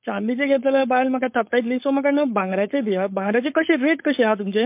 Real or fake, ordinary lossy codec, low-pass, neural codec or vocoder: fake; MP3, 32 kbps; 3.6 kHz; codec, 16 kHz, 4.8 kbps, FACodec